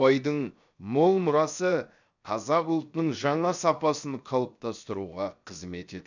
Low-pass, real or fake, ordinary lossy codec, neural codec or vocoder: 7.2 kHz; fake; none; codec, 16 kHz, 0.7 kbps, FocalCodec